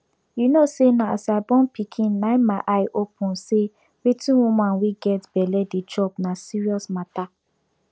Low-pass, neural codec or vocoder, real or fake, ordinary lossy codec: none; none; real; none